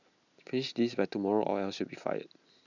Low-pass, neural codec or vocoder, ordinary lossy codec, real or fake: 7.2 kHz; none; none; real